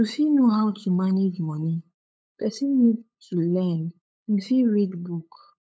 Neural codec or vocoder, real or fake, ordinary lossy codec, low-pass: codec, 16 kHz, 8 kbps, FunCodec, trained on LibriTTS, 25 frames a second; fake; none; none